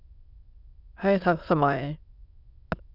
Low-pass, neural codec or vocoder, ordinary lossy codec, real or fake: 5.4 kHz; autoencoder, 22.05 kHz, a latent of 192 numbers a frame, VITS, trained on many speakers; Opus, 64 kbps; fake